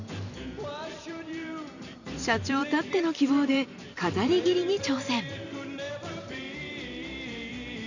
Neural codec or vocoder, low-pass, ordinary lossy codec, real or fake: vocoder, 44.1 kHz, 128 mel bands every 512 samples, BigVGAN v2; 7.2 kHz; none; fake